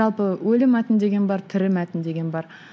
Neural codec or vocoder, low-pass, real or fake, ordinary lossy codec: none; none; real; none